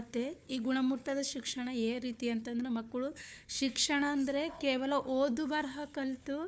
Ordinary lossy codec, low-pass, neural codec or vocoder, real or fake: none; none; codec, 16 kHz, 4 kbps, FunCodec, trained on Chinese and English, 50 frames a second; fake